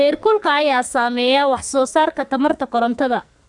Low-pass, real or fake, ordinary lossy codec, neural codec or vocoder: 10.8 kHz; fake; none; codec, 44.1 kHz, 2.6 kbps, SNAC